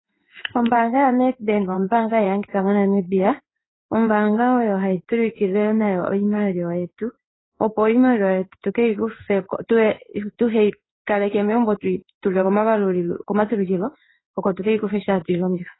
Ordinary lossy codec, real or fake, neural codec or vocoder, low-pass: AAC, 16 kbps; fake; codec, 16 kHz in and 24 kHz out, 1 kbps, XY-Tokenizer; 7.2 kHz